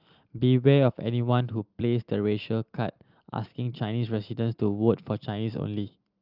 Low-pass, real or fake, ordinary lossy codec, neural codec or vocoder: 5.4 kHz; real; Opus, 24 kbps; none